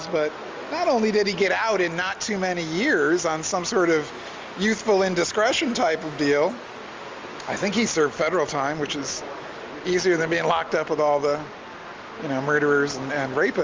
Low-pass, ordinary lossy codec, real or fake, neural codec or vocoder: 7.2 kHz; Opus, 32 kbps; real; none